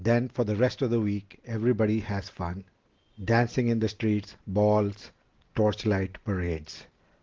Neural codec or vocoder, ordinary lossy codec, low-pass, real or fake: none; Opus, 16 kbps; 7.2 kHz; real